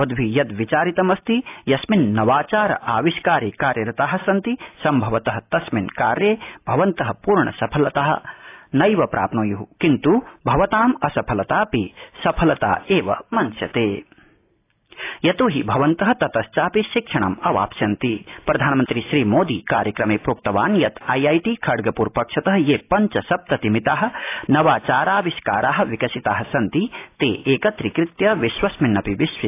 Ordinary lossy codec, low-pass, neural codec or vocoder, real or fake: AAC, 24 kbps; 3.6 kHz; none; real